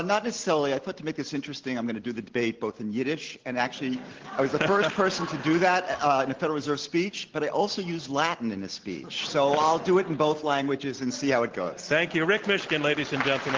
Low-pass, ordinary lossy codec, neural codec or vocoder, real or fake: 7.2 kHz; Opus, 16 kbps; none; real